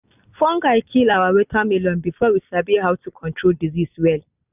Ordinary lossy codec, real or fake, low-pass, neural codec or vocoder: none; real; 3.6 kHz; none